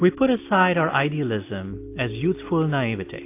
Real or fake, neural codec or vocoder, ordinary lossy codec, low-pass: real; none; AAC, 24 kbps; 3.6 kHz